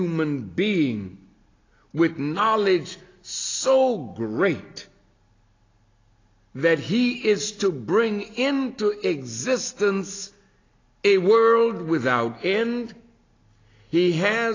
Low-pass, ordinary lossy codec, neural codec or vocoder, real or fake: 7.2 kHz; AAC, 32 kbps; none; real